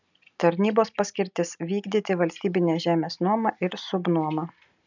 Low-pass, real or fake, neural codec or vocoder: 7.2 kHz; real; none